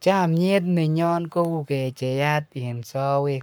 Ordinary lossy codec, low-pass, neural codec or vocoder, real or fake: none; none; codec, 44.1 kHz, 7.8 kbps, Pupu-Codec; fake